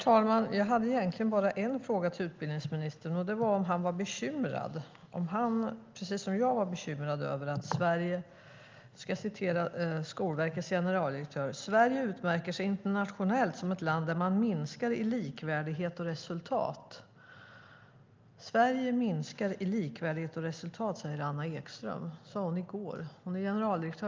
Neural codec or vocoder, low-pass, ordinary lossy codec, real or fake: none; 7.2 kHz; Opus, 24 kbps; real